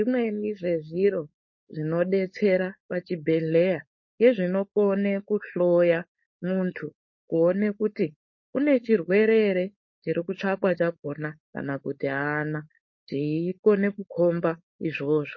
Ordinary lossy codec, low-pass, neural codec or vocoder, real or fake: MP3, 32 kbps; 7.2 kHz; codec, 16 kHz, 4.8 kbps, FACodec; fake